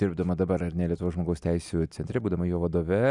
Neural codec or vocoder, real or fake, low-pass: none; real; 10.8 kHz